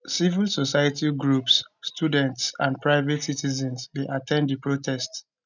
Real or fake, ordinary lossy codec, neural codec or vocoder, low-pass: real; none; none; 7.2 kHz